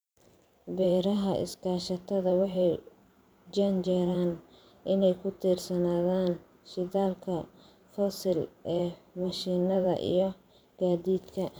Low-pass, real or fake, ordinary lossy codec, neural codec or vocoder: none; fake; none; vocoder, 44.1 kHz, 128 mel bands every 512 samples, BigVGAN v2